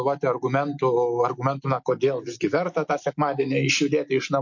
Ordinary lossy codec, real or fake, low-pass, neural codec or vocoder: MP3, 48 kbps; real; 7.2 kHz; none